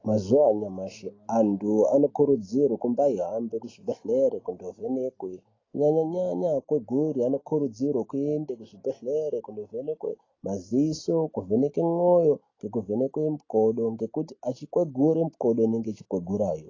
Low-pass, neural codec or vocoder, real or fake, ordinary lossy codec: 7.2 kHz; none; real; AAC, 32 kbps